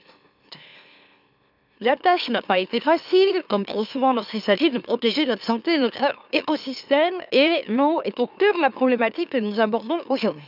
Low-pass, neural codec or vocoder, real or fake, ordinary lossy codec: 5.4 kHz; autoencoder, 44.1 kHz, a latent of 192 numbers a frame, MeloTTS; fake; none